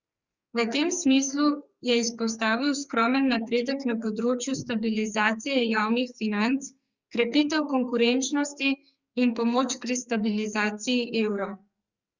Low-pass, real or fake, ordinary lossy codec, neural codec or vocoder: 7.2 kHz; fake; Opus, 64 kbps; codec, 44.1 kHz, 2.6 kbps, SNAC